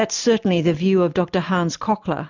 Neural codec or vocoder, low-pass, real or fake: none; 7.2 kHz; real